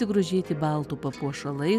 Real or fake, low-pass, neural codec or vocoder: real; 14.4 kHz; none